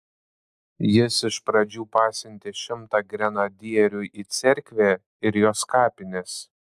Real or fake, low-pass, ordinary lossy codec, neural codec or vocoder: real; 14.4 kHz; AAC, 96 kbps; none